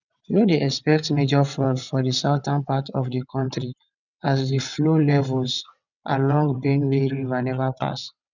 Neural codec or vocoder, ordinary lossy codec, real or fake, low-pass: vocoder, 22.05 kHz, 80 mel bands, WaveNeXt; none; fake; 7.2 kHz